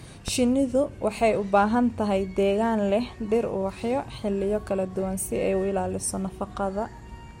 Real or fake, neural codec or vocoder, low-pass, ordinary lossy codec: real; none; 19.8 kHz; MP3, 64 kbps